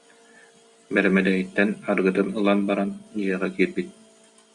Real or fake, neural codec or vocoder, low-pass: real; none; 10.8 kHz